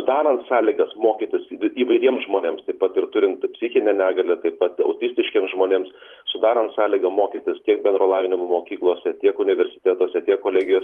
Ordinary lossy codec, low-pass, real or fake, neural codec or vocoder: Opus, 32 kbps; 19.8 kHz; fake; vocoder, 44.1 kHz, 128 mel bands every 512 samples, BigVGAN v2